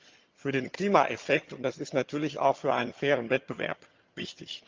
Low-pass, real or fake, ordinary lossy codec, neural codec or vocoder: 7.2 kHz; fake; Opus, 24 kbps; vocoder, 22.05 kHz, 80 mel bands, HiFi-GAN